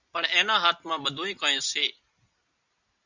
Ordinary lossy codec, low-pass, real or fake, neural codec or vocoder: Opus, 64 kbps; 7.2 kHz; real; none